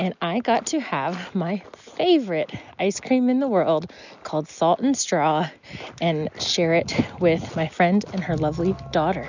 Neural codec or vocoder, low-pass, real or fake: none; 7.2 kHz; real